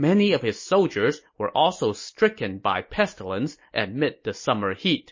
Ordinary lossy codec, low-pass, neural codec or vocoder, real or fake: MP3, 32 kbps; 7.2 kHz; none; real